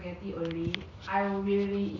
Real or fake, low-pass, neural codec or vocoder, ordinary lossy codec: real; 7.2 kHz; none; none